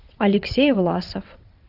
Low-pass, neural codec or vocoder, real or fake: 5.4 kHz; vocoder, 22.05 kHz, 80 mel bands, WaveNeXt; fake